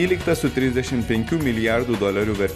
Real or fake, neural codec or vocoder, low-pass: real; none; 14.4 kHz